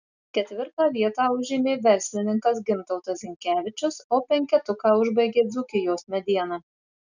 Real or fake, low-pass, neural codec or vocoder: real; 7.2 kHz; none